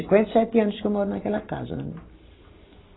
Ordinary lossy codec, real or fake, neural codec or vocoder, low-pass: AAC, 16 kbps; real; none; 7.2 kHz